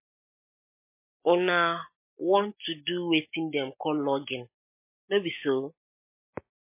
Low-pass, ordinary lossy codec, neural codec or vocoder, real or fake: 3.6 kHz; MP3, 24 kbps; none; real